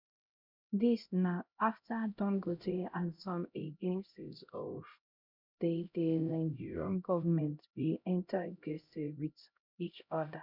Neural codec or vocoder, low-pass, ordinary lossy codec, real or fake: codec, 16 kHz, 0.5 kbps, X-Codec, HuBERT features, trained on LibriSpeech; 5.4 kHz; none; fake